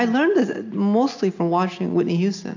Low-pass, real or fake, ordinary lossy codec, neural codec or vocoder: 7.2 kHz; real; AAC, 48 kbps; none